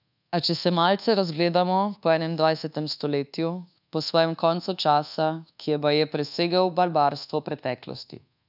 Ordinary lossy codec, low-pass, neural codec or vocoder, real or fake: none; 5.4 kHz; codec, 24 kHz, 1.2 kbps, DualCodec; fake